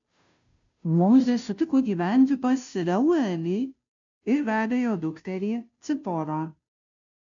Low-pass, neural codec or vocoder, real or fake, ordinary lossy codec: 7.2 kHz; codec, 16 kHz, 0.5 kbps, FunCodec, trained on Chinese and English, 25 frames a second; fake; AAC, 64 kbps